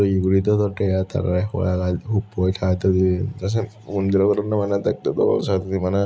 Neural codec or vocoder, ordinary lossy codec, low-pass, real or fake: none; none; none; real